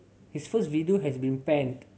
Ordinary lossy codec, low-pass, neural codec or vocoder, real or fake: none; none; none; real